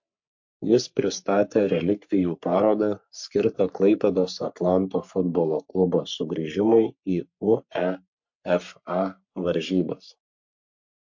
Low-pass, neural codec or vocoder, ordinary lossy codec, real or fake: 7.2 kHz; codec, 44.1 kHz, 3.4 kbps, Pupu-Codec; MP3, 48 kbps; fake